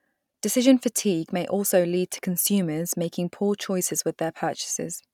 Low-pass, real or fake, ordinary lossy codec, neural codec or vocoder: 19.8 kHz; real; none; none